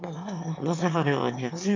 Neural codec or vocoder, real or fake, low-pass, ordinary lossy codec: autoencoder, 22.05 kHz, a latent of 192 numbers a frame, VITS, trained on one speaker; fake; 7.2 kHz; MP3, 64 kbps